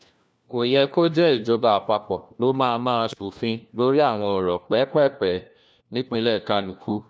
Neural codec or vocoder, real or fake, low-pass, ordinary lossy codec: codec, 16 kHz, 1 kbps, FunCodec, trained on LibriTTS, 50 frames a second; fake; none; none